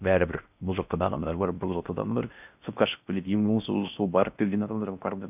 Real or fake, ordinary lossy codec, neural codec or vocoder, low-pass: fake; none; codec, 16 kHz in and 24 kHz out, 0.6 kbps, FocalCodec, streaming, 4096 codes; 3.6 kHz